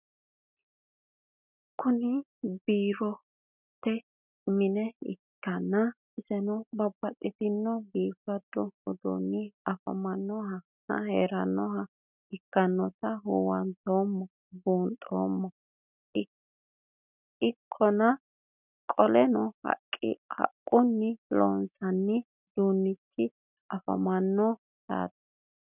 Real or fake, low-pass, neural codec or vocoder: real; 3.6 kHz; none